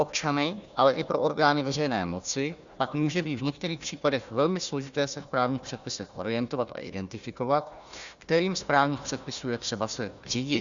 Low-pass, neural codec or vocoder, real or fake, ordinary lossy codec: 7.2 kHz; codec, 16 kHz, 1 kbps, FunCodec, trained on Chinese and English, 50 frames a second; fake; Opus, 64 kbps